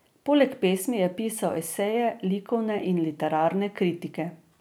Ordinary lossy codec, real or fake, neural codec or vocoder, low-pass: none; real; none; none